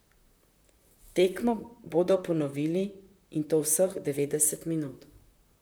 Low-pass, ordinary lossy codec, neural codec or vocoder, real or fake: none; none; vocoder, 44.1 kHz, 128 mel bands, Pupu-Vocoder; fake